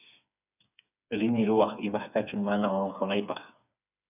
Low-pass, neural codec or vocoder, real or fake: 3.6 kHz; codec, 16 kHz, 4 kbps, FreqCodec, smaller model; fake